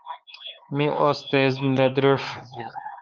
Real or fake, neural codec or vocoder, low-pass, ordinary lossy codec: fake; codec, 16 kHz, 4 kbps, X-Codec, HuBERT features, trained on LibriSpeech; 7.2 kHz; Opus, 32 kbps